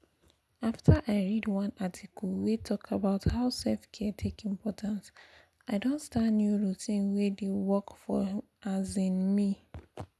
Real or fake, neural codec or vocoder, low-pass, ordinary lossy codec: real; none; none; none